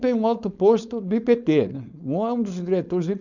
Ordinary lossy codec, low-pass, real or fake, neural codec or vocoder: none; 7.2 kHz; fake; codec, 16 kHz, 4.8 kbps, FACodec